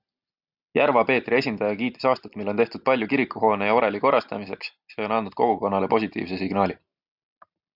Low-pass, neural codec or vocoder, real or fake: 5.4 kHz; none; real